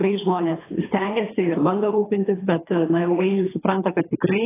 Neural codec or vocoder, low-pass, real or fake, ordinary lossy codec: codec, 24 kHz, 3 kbps, HILCodec; 3.6 kHz; fake; AAC, 16 kbps